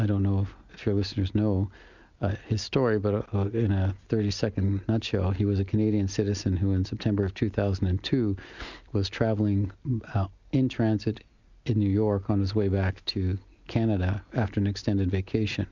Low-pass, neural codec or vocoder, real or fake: 7.2 kHz; none; real